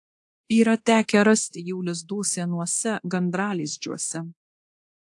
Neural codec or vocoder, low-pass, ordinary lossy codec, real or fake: codec, 24 kHz, 0.9 kbps, DualCodec; 10.8 kHz; AAC, 64 kbps; fake